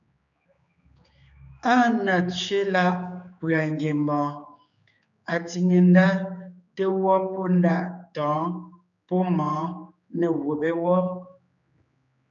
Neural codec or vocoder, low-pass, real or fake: codec, 16 kHz, 4 kbps, X-Codec, HuBERT features, trained on general audio; 7.2 kHz; fake